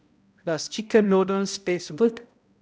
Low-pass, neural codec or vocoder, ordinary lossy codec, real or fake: none; codec, 16 kHz, 0.5 kbps, X-Codec, HuBERT features, trained on balanced general audio; none; fake